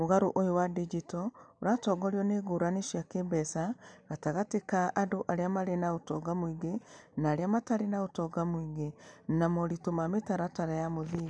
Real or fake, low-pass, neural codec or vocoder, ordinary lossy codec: real; 9.9 kHz; none; none